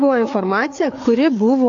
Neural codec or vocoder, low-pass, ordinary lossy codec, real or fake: codec, 16 kHz, 4 kbps, FreqCodec, larger model; 7.2 kHz; AAC, 48 kbps; fake